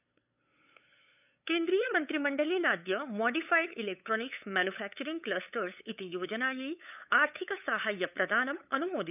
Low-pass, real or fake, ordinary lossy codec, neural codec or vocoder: 3.6 kHz; fake; none; codec, 16 kHz, 8 kbps, FunCodec, trained on LibriTTS, 25 frames a second